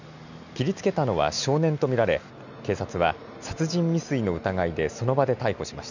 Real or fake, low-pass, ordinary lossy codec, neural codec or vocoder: real; 7.2 kHz; none; none